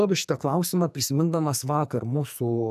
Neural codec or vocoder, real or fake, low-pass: codec, 44.1 kHz, 2.6 kbps, SNAC; fake; 14.4 kHz